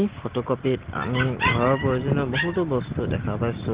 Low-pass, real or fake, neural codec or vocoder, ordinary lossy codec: 3.6 kHz; real; none; Opus, 16 kbps